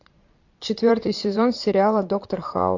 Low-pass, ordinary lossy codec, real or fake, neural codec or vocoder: 7.2 kHz; MP3, 48 kbps; fake; vocoder, 22.05 kHz, 80 mel bands, Vocos